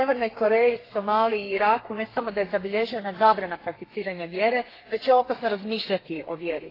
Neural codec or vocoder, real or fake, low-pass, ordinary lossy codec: codec, 32 kHz, 1.9 kbps, SNAC; fake; 5.4 kHz; AAC, 24 kbps